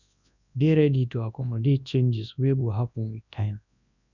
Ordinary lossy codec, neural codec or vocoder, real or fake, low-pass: none; codec, 24 kHz, 0.9 kbps, WavTokenizer, large speech release; fake; 7.2 kHz